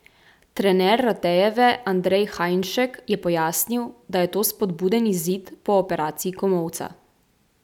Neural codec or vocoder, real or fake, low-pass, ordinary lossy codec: none; real; 19.8 kHz; none